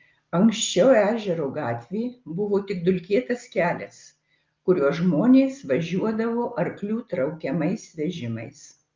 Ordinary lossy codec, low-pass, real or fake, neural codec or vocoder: Opus, 24 kbps; 7.2 kHz; real; none